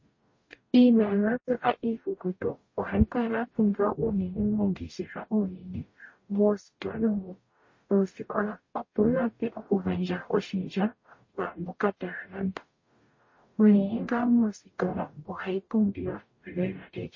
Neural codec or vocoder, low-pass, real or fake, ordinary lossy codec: codec, 44.1 kHz, 0.9 kbps, DAC; 7.2 kHz; fake; MP3, 32 kbps